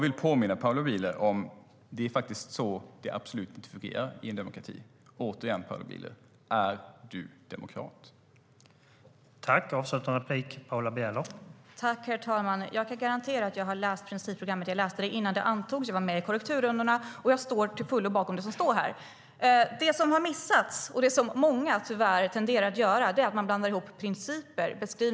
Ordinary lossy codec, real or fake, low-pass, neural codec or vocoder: none; real; none; none